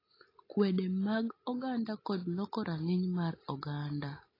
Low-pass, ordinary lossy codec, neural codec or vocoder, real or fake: 5.4 kHz; AAC, 24 kbps; none; real